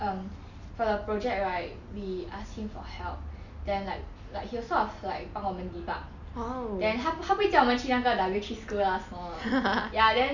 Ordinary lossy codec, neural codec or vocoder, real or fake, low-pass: none; none; real; 7.2 kHz